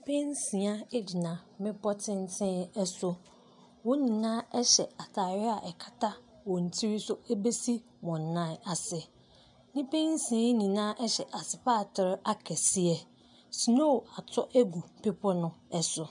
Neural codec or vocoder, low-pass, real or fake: none; 10.8 kHz; real